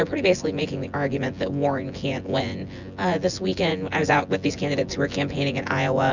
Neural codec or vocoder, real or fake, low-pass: vocoder, 24 kHz, 100 mel bands, Vocos; fake; 7.2 kHz